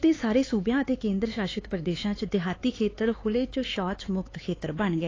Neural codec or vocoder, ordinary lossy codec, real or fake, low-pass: codec, 16 kHz, 4 kbps, X-Codec, HuBERT features, trained on LibriSpeech; AAC, 32 kbps; fake; 7.2 kHz